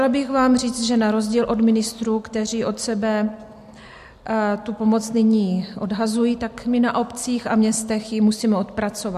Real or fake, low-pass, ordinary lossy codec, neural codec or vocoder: real; 14.4 kHz; MP3, 64 kbps; none